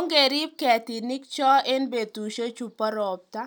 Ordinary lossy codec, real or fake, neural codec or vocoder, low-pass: none; real; none; none